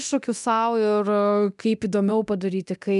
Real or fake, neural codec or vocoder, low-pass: fake; codec, 24 kHz, 0.9 kbps, DualCodec; 10.8 kHz